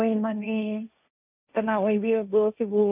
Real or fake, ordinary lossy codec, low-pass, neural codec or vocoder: fake; none; 3.6 kHz; codec, 16 kHz, 1.1 kbps, Voila-Tokenizer